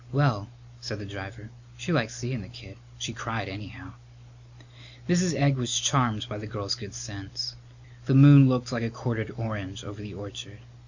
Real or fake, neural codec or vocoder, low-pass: real; none; 7.2 kHz